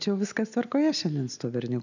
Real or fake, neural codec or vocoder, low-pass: real; none; 7.2 kHz